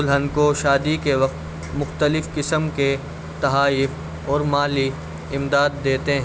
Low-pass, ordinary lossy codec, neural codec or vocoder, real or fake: none; none; none; real